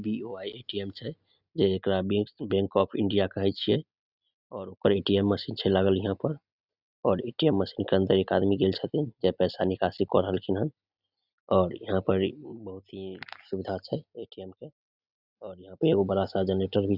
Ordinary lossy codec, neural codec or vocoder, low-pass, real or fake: none; none; 5.4 kHz; real